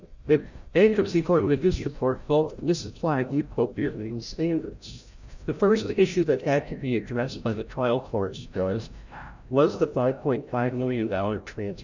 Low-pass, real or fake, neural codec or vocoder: 7.2 kHz; fake; codec, 16 kHz, 0.5 kbps, FreqCodec, larger model